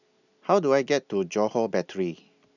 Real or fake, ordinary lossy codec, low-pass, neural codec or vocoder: real; none; 7.2 kHz; none